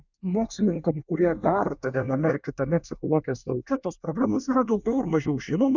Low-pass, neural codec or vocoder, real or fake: 7.2 kHz; codec, 24 kHz, 1 kbps, SNAC; fake